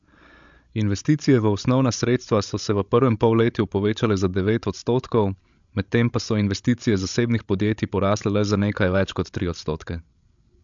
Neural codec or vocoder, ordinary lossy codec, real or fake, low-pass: codec, 16 kHz, 16 kbps, FreqCodec, larger model; MP3, 64 kbps; fake; 7.2 kHz